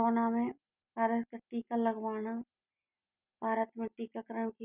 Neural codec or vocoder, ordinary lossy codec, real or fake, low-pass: none; none; real; 3.6 kHz